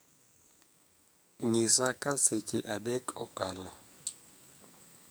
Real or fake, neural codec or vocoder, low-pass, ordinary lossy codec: fake; codec, 44.1 kHz, 2.6 kbps, SNAC; none; none